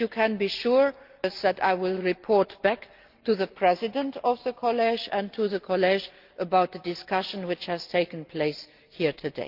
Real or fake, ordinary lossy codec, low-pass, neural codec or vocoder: real; Opus, 24 kbps; 5.4 kHz; none